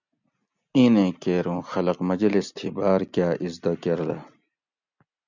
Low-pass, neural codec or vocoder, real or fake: 7.2 kHz; none; real